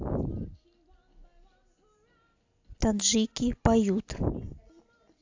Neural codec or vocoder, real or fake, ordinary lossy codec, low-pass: none; real; AAC, 48 kbps; 7.2 kHz